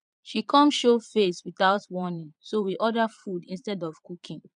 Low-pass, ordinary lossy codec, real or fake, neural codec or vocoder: 9.9 kHz; none; fake; vocoder, 22.05 kHz, 80 mel bands, Vocos